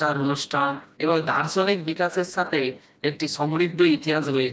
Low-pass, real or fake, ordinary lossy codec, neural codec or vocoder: none; fake; none; codec, 16 kHz, 1 kbps, FreqCodec, smaller model